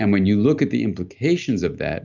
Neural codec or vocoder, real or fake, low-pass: none; real; 7.2 kHz